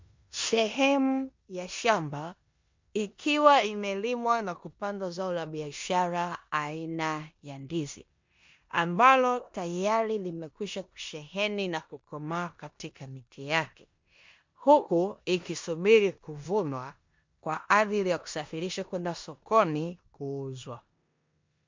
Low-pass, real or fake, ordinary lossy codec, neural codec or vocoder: 7.2 kHz; fake; MP3, 48 kbps; codec, 16 kHz in and 24 kHz out, 0.9 kbps, LongCat-Audio-Codec, four codebook decoder